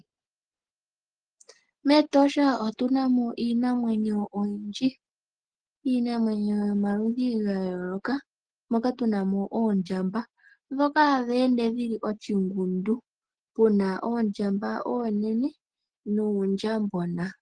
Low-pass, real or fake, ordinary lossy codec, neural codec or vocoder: 9.9 kHz; real; Opus, 16 kbps; none